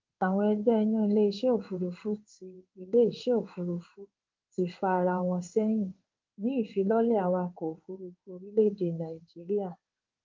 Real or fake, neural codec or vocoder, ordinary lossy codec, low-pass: fake; codec, 16 kHz in and 24 kHz out, 1 kbps, XY-Tokenizer; Opus, 24 kbps; 7.2 kHz